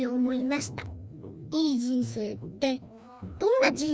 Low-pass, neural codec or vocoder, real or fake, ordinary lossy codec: none; codec, 16 kHz, 1 kbps, FreqCodec, larger model; fake; none